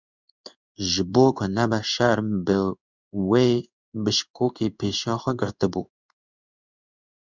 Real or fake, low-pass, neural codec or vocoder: fake; 7.2 kHz; codec, 16 kHz in and 24 kHz out, 1 kbps, XY-Tokenizer